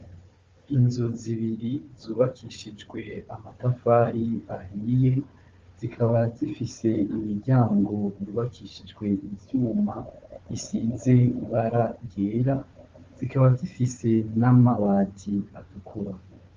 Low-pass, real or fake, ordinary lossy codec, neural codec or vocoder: 7.2 kHz; fake; Opus, 32 kbps; codec, 16 kHz, 4 kbps, FunCodec, trained on Chinese and English, 50 frames a second